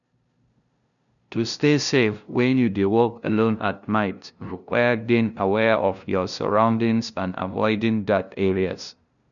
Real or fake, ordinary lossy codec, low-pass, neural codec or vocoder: fake; none; 7.2 kHz; codec, 16 kHz, 0.5 kbps, FunCodec, trained on LibriTTS, 25 frames a second